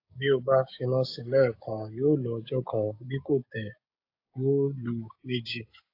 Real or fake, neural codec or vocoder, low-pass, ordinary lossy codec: fake; codec, 16 kHz, 6 kbps, DAC; 5.4 kHz; AAC, 32 kbps